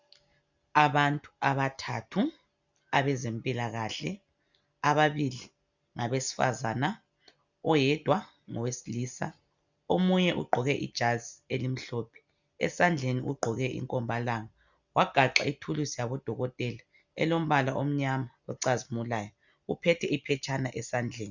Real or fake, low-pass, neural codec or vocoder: real; 7.2 kHz; none